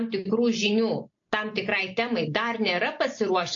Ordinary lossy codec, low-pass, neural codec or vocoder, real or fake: AAC, 48 kbps; 7.2 kHz; none; real